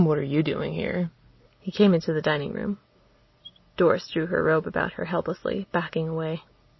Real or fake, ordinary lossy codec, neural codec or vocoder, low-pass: real; MP3, 24 kbps; none; 7.2 kHz